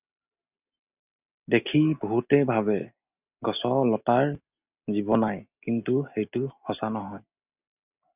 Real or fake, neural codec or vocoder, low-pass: real; none; 3.6 kHz